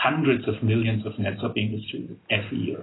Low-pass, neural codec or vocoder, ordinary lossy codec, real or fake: 7.2 kHz; none; AAC, 16 kbps; real